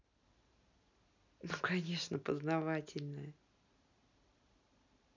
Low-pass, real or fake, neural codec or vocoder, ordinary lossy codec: 7.2 kHz; real; none; none